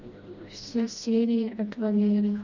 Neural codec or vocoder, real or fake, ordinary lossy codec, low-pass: codec, 16 kHz, 1 kbps, FreqCodec, smaller model; fake; Opus, 64 kbps; 7.2 kHz